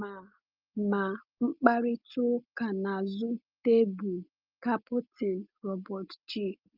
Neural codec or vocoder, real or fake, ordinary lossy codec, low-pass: none; real; Opus, 32 kbps; 5.4 kHz